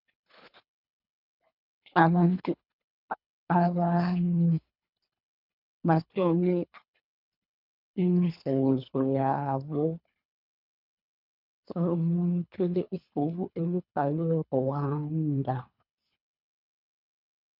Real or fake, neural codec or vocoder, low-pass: fake; codec, 24 kHz, 3 kbps, HILCodec; 5.4 kHz